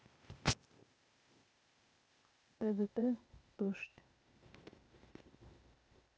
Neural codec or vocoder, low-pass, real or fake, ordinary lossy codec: codec, 16 kHz, 0.8 kbps, ZipCodec; none; fake; none